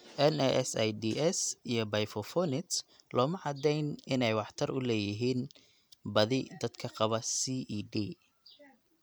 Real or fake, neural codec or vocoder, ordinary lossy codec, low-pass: real; none; none; none